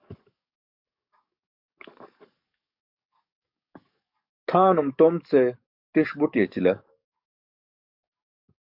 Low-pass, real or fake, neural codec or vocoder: 5.4 kHz; fake; codec, 44.1 kHz, 7.8 kbps, DAC